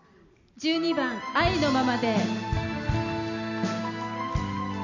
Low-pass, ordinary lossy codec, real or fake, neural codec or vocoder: 7.2 kHz; none; real; none